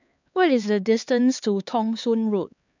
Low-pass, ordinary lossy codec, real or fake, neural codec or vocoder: 7.2 kHz; none; fake; codec, 16 kHz, 4 kbps, X-Codec, HuBERT features, trained on LibriSpeech